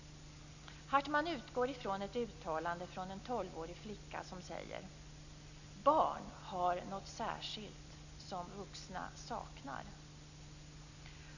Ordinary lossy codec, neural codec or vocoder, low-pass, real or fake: none; none; 7.2 kHz; real